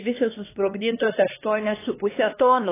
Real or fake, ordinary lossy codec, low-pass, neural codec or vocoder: fake; AAC, 16 kbps; 3.6 kHz; codec, 16 kHz, 2 kbps, X-Codec, HuBERT features, trained on LibriSpeech